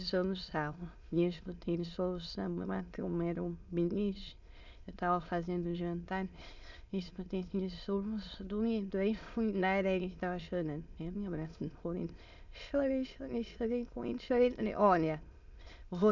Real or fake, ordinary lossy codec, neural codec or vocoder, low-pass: fake; Opus, 64 kbps; autoencoder, 22.05 kHz, a latent of 192 numbers a frame, VITS, trained on many speakers; 7.2 kHz